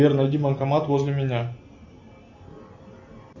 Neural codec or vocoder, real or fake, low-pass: none; real; 7.2 kHz